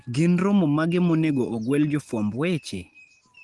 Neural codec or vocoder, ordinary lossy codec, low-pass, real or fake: none; Opus, 24 kbps; 10.8 kHz; real